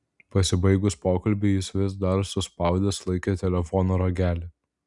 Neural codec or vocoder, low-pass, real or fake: none; 10.8 kHz; real